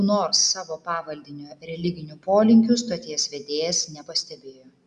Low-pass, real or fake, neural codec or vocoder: 9.9 kHz; real; none